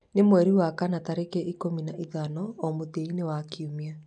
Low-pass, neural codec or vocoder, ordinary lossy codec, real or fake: 9.9 kHz; none; none; real